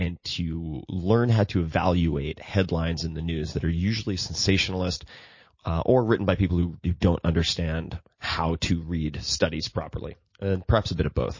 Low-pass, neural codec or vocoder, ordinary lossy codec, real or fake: 7.2 kHz; none; MP3, 32 kbps; real